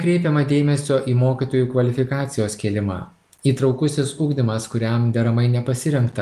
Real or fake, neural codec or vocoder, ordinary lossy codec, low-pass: real; none; Opus, 32 kbps; 14.4 kHz